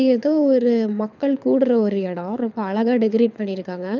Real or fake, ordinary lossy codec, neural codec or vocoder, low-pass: fake; none; codec, 24 kHz, 6 kbps, HILCodec; 7.2 kHz